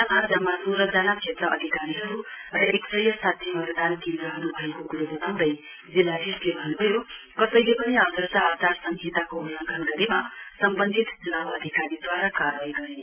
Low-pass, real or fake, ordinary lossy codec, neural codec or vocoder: 3.6 kHz; real; MP3, 32 kbps; none